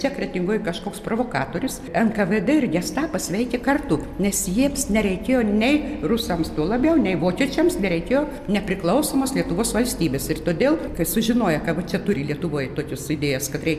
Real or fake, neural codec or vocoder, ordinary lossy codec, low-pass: real; none; MP3, 96 kbps; 14.4 kHz